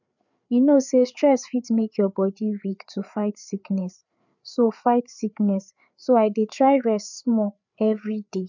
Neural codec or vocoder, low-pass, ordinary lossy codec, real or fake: codec, 16 kHz, 4 kbps, FreqCodec, larger model; 7.2 kHz; none; fake